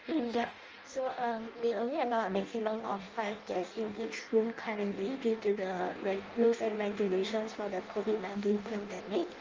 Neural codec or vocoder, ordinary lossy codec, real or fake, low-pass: codec, 16 kHz in and 24 kHz out, 0.6 kbps, FireRedTTS-2 codec; Opus, 24 kbps; fake; 7.2 kHz